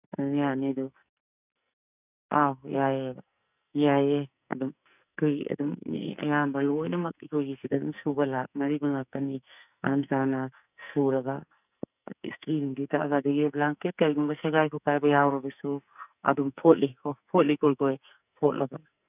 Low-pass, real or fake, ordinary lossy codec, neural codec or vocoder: 3.6 kHz; fake; none; codec, 44.1 kHz, 2.6 kbps, SNAC